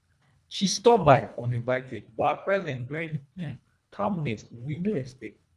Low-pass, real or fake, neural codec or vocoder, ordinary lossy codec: none; fake; codec, 24 kHz, 1.5 kbps, HILCodec; none